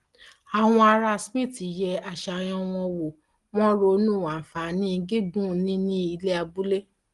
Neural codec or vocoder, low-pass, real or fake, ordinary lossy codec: none; 10.8 kHz; real; Opus, 24 kbps